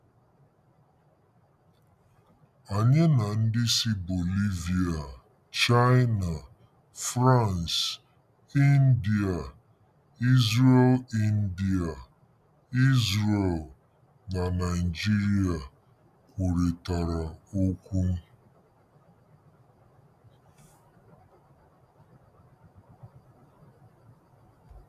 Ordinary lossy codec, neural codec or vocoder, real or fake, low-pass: none; none; real; 14.4 kHz